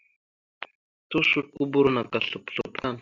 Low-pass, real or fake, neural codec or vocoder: 7.2 kHz; real; none